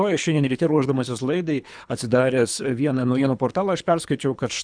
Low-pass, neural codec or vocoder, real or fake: 9.9 kHz; codec, 24 kHz, 3 kbps, HILCodec; fake